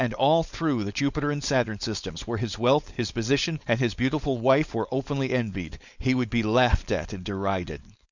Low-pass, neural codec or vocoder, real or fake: 7.2 kHz; codec, 16 kHz, 4.8 kbps, FACodec; fake